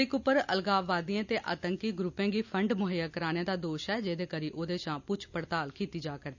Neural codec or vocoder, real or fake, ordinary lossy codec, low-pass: none; real; none; 7.2 kHz